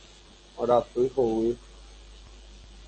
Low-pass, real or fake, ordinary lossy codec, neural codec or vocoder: 10.8 kHz; real; MP3, 32 kbps; none